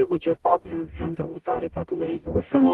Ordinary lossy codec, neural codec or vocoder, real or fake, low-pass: Opus, 24 kbps; codec, 44.1 kHz, 0.9 kbps, DAC; fake; 19.8 kHz